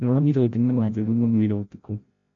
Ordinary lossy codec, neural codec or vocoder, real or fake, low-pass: MP3, 48 kbps; codec, 16 kHz, 0.5 kbps, FreqCodec, larger model; fake; 7.2 kHz